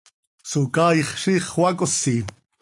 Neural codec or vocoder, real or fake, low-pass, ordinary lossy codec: none; real; 10.8 kHz; AAC, 64 kbps